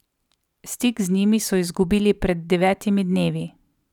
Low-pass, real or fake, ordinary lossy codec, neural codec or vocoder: 19.8 kHz; fake; none; vocoder, 44.1 kHz, 128 mel bands every 512 samples, BigVGAN v2